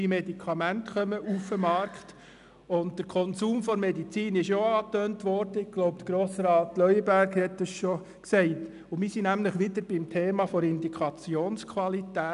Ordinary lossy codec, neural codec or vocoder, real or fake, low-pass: none; none; real; 10.8 kHz